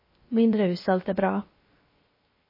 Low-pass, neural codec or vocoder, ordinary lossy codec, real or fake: 5.4 kHz; codec, 16 kHz, 0.3 kbps, FocalCodec; MP3, 24 kbps; fake